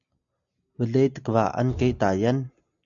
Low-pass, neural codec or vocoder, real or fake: 7.2 kHz; none; real